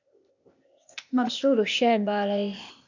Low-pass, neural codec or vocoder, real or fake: 7.2 kHz; codec, 16 kHz, 0.8 kbps, ZipCodec; fake